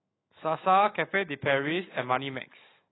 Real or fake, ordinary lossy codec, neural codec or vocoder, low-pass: fake; AAC, 16 kbps; autoencoder, 48 kHz, 128 numbers a frame, DAC-VAE, trained on Japanese speech; 7.2 kHz